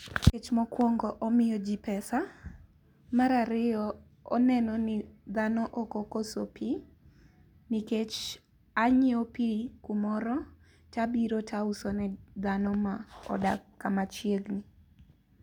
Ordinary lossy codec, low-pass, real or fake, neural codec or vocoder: none; 19.8 kHz; real; none